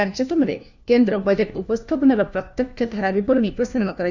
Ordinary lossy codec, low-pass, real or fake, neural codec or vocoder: none; 7.2 kHz; fake; codec, 16 kHz, 1 kbps, FunCodec, trained on LibriTTS, 50 frames a second